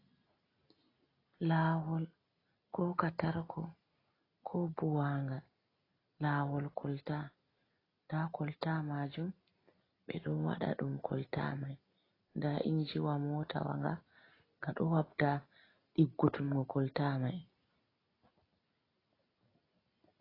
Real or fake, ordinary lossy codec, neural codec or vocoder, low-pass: real; AAC, 24 kbps; none; 5.4 kHz